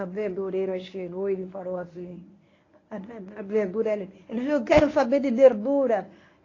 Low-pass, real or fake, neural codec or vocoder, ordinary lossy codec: 7.2 kHz; fake; codec, 24 kHz, 0.9 kbps, WavTokenizer, medium speech release version 1; AAC, 32 kbps